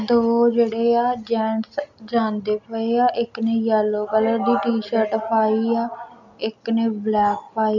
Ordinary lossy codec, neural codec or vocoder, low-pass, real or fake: none; none; 7.2 kHz; real